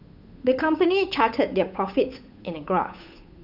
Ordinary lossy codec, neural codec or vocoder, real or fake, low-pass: none; codec, 16 kHz, 8 kbps, FunCodec, trained on Chinese and English, 25 frames a second; fake; 5.4 kHz